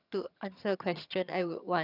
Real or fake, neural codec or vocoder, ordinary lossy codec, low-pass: fake; vocoder, 22.05 kHz, 80 mel bands, HiFi-GAN; none; 5.4 kHz